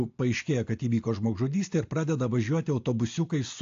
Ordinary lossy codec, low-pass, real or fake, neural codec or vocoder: AAC, 48 kbps; 7.2 kHz; real; none